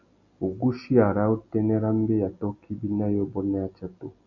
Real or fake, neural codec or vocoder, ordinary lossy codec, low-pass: real; none; MP3, 64 kbps; 7.2 kHz